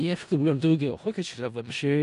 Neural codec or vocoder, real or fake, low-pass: codec, 16 kHz in and 24 kHz out, 0.4 kbps, LongCat-Audio-Codec, four codebook decoder; fake; 10.8 kHz